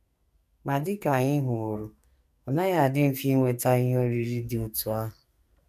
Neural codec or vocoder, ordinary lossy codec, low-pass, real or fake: codec, 44.1 kHz, 2.6 kbps, SNAC; none; 14.4 kHz; fake